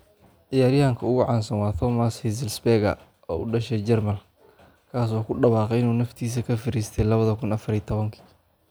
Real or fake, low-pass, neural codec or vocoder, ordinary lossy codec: real; none; none; none